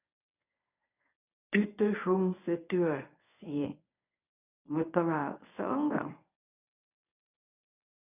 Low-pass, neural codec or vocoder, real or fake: 3.6 kHz; codec, 24 kHz, 0.9 kbps, WavTokenizer, medium speech release version 1; fake